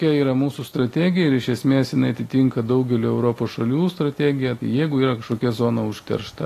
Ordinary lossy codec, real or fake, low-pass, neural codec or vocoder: AAC, 48 kbps; real; 14.4 kHz; none